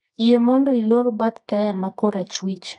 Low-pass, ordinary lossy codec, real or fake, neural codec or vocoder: 10.8 kHz; none; fake; codec, 24 kHz, 0.9 kbps, WavTokenizer, medium music audio release